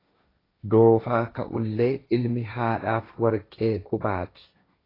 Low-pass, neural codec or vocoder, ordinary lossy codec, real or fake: 5.4 kHz; codec, 16 kHz, 1.1 kbps, Voila-Tokenizer; AAC, 24 kbps; fake